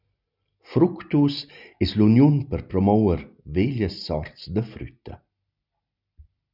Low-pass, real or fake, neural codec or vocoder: 5.4 kHz; real; none